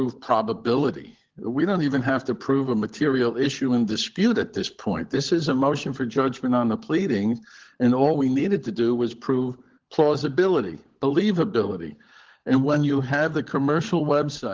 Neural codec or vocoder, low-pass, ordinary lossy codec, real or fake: codec, 16 kHz, 16 kbps, FunCodec, trained on LibriTTS, 50 frames a second; 7.2 kHz; Opus, 16 kbps; fake